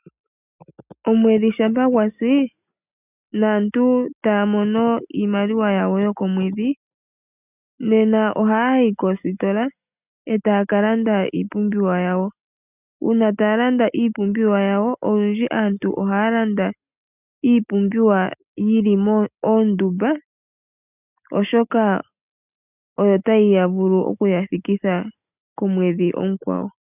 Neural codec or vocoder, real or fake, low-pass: none; real; 3.6 kHz